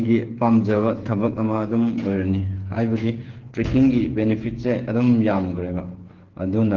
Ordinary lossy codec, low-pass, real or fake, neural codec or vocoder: Opus, 16 kbps; 7.2 kHz; fake; codec, 16 kHz, 8 kbps, FreqCodec, smaller model